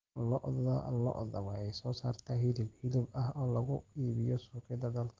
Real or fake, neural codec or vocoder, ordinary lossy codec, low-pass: real; none; Opus, 16 kbps; 7.2 kHz